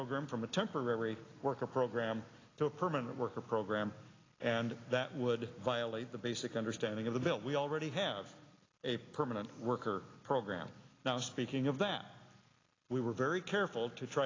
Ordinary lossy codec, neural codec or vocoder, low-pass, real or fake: AAC, 32 kbps; none; 7.2 kHz; real